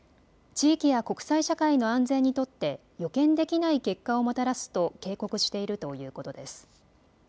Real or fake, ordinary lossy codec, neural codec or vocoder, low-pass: real; none; none; none